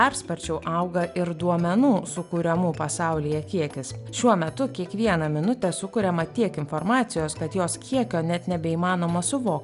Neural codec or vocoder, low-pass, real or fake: none; 10.8 kHz; real